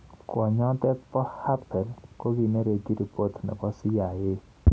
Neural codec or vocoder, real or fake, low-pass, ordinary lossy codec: none; real; none; none